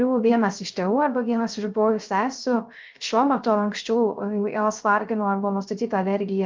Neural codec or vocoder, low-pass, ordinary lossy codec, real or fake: codec, 16 kHz, 0.3 kbps, FocalCodec; 7.2 kHz; Opus, 32 kbps; fake